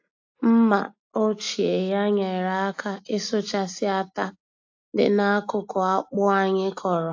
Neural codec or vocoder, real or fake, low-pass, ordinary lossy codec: none; real; 7.2 kHz; AAC, 48 kbps